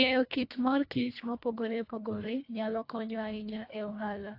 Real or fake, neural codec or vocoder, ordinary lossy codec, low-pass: fake; codec, 24 kHz, 1.5 kbps, HILCodec; AAC, 32 kbps; 5.4 kHz